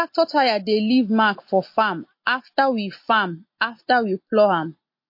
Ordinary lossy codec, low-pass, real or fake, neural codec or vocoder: MP3, 32 kbps; 5.4 kHz; real; none